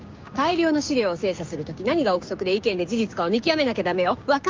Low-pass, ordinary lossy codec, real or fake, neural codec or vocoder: 7.2 kHz; Opus, 24 kbps; fake; codec, 16 kHz, 6 kbps, DAC